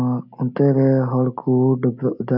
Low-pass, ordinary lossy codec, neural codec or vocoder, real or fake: 5.4 kHz; none; none; real